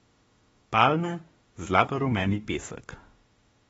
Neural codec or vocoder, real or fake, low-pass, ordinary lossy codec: autoencoder, 48 kHz, 32 numbers a frame, DAC-VAE, trained on Japanese speech; fake; 19.8 kHz; AAC, 24 kbps